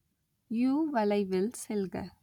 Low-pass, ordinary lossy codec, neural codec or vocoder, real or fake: 19.8 kHz; none; none; real